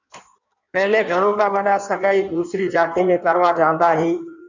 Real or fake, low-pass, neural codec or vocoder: fake; 7.2 kHz; codec, 16 kHz in and 24 kHz out, 1.1 kbps, FireRedTTS-2 codec